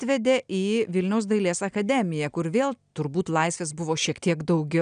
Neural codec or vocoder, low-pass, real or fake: none; 9.9 kHz; real